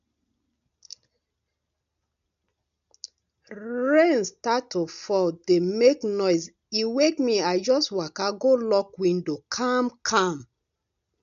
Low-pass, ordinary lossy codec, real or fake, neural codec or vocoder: 7.2 kHz; none; real; none